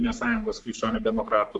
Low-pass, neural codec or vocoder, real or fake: 10.8 kHz; codec, 44.1 kHz, 3.4 kbps, Pupu-Codec; fake